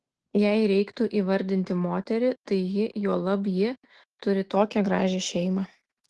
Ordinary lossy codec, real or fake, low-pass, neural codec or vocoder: Opus, 16 kbps; fake; 10.8 kHz; autoencoder, 48 kHz, 128 numbers a frame, DAC-VAE, trained on Japanese speech